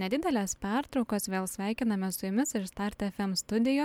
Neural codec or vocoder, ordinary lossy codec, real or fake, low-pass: none; MP3, 96 kbps; real; 19.8 kHz